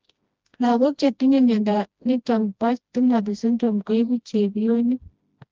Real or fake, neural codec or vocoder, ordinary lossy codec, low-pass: fake; codec, 16 kHz, 1 kbps, FreqCodec, smaller model; Opus, 24 kbps; 7.2 kHz